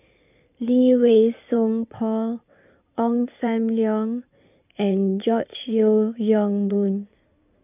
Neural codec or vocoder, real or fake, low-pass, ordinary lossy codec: codec, 16 kHz in and 24 kHz out, 2.2 kbps, FireRedTTS-2 codec; fake; 3.6 kHz; none